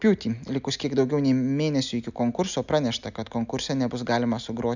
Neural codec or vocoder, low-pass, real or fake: none; 7.2 kHz; real